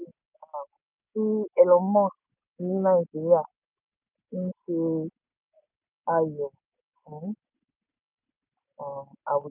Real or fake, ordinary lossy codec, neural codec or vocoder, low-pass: real; none; none; 3.6 kHz